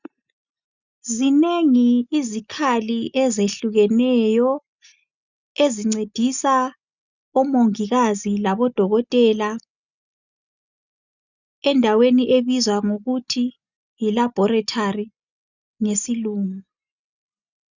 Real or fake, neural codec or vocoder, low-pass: real; none; 7.2 kHz